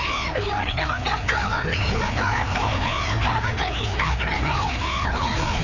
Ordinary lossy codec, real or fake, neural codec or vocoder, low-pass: none; fake; codec, 16 kHz, 2 kbps, FreqCodec, larger model; 7.2 kHz